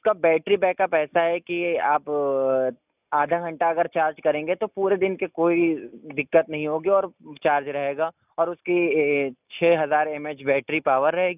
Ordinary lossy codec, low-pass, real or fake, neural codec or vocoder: none; 3.6 kHz; real; none